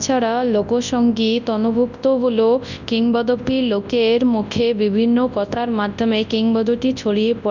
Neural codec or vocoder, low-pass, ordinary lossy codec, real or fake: codec, 24 kHz, 0.9 kbps, WavTokenizer, large speech release; 7.2 kHz; none; fake